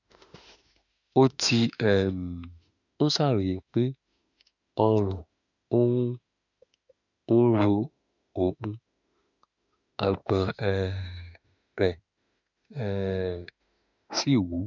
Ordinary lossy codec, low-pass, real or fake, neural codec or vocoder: none; 7.2 kHz; fake; autoencoder, 48 kHz, 32 numbers a frame, DAC-VAE, trained on Japanese speech